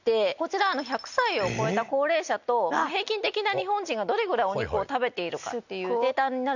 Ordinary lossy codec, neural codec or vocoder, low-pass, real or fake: none; none; 7.2 kHz; real